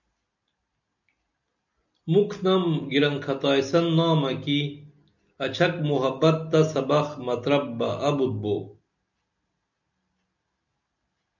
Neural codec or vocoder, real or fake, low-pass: none; real; 7.2 kHz